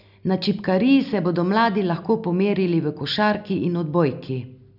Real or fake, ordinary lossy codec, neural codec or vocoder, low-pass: real; none; none; 5.4 kHz